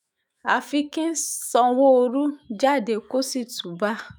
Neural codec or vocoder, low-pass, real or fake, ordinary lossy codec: autoencoder, 48 kHz, 128 numbers a frame, DAC-VAE, trained on Japanese speech; none; fake; none